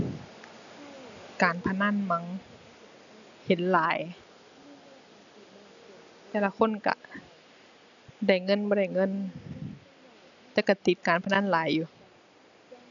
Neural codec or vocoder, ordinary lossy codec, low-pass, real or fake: none; none; 7.2 kHz; real